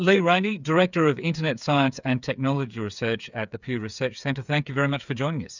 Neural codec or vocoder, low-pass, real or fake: codec, 16 kHz, 8 kbps, FreqCodec, smaller model; 7.2 kHz; fake